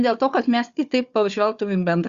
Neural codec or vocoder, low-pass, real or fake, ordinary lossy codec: codec, 16 kHz, 4 kbps, FunCodec, trained on Chinese and English, 50 frames a second; 7.2 kHz; fake; Opus, 64 kbps